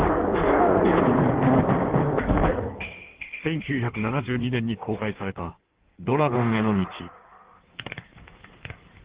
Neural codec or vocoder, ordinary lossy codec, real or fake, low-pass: codec, 16 kHz in and 24 kHz out, 1.1 kbps, FireRedTTS-2 codec; Opus, 16 kbps; fake; 3.6 kHz